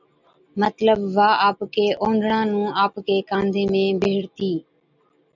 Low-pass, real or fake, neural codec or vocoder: 7.2 kHz; real; none